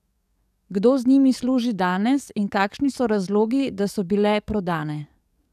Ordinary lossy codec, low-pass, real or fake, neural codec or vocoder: none; 14.4 kHz; fake; codec, 44.1 kHz, 7.8 kbps, DAC